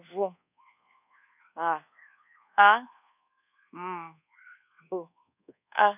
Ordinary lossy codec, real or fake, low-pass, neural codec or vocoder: none; fake; 3.6 kHz; codec, 24 kHz, 1.2 kbps, DualCodec